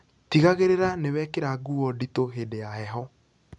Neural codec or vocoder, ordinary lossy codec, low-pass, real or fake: none; none; 10.8 kHz; real